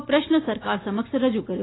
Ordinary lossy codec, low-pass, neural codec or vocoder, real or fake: AAC, 16 kbps; 7.2 kHz; none; real